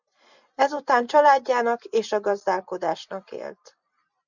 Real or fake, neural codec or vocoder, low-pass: fake; vocoder, 44.1 kHz, 128 mel bands every 256 samples, BigVGAN v2; 7.2 kHz